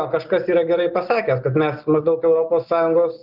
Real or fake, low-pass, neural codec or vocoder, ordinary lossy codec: real; 5.4 kHz; none; Opus, 24 kbps